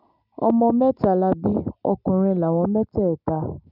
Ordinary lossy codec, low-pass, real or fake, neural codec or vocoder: none; 5.4 kHz; real; none